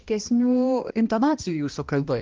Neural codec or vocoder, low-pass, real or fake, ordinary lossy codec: codec, 16 kHz, 1 kbps, X-Codec, HuBERT features, trained on balanced general audio; 7.2 kHz; fake; Opus, 32 kbps